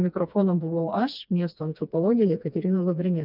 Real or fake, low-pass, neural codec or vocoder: fake; 5.4 kHz; codec, 16 kHz, 2 kbps, FreqCodec, smaller model